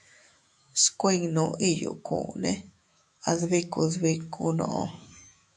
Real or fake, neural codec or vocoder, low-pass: fake; autoencoder, 48 kHz, 128 numbers a frame, DAC-VAE, trained on Japanese speech; 9.9 kHz